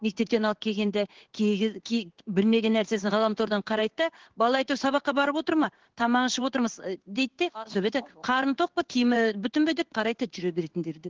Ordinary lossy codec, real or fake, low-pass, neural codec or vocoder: Opus, 16 kbps; fake; 7.2 kHz; codec, 16 kHz in and 24 kHz out, 1 kbps, XY-Tokenizer